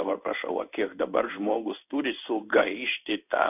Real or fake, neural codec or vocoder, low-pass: fake; codec, 16 kHz in and 24 kHz out, 1 kbps, XY-Tokenizer; 3.6 kHz